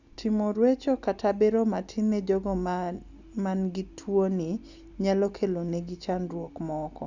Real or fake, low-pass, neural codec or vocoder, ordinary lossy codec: real; 7.2 kHz; none; Opus, 64 kbps